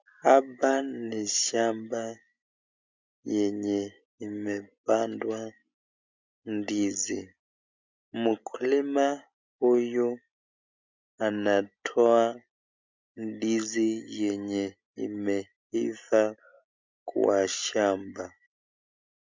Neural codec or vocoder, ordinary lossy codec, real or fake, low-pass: none; MP3, 64 kbps; real; 7.2 kHz